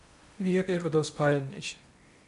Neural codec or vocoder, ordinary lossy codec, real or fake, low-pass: codec, 16 kHz in and 24 kHz out, 0.8 kbps, FocalCodec, streaming, 65536 codes; MP3, 64 kbps; fake; 10.8 kHz